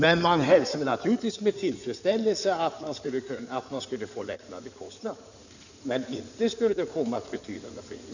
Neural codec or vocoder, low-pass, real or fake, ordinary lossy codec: codec, 16 kHz in and 24 kHz out, 2.2 kbps, FireRedTTS-2 codec; 7.2 kHz; fake; none